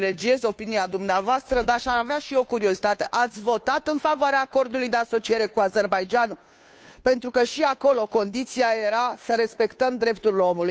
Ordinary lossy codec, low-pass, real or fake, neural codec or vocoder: none; none; fake; codec, 16 kHz, 2 kbps, FunCodec, trained on Chinese and English, 25 frames a second